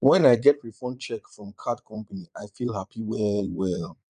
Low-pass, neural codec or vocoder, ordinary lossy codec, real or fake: 9.9 kHz; vocoder, 22.05 kHz, 80 mel bands, Vocos; none; fake